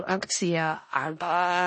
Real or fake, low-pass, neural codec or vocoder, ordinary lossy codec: fake; 10.8 kHz; codec, 16 kHz in and 24 kHz out, 0.4 kbps, LongCat-Audio-Codec, four codebook decoder; MP3, 32 kbps